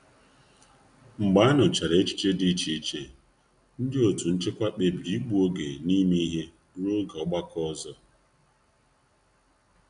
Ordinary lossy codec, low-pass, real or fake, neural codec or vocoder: none; 9.9 kHz; real; none